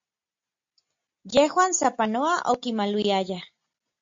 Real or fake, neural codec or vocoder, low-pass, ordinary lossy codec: real; none; 7.2 kHz; MP3, 48 kbps